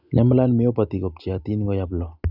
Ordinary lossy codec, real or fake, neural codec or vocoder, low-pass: none; real; none; 5.4 kHz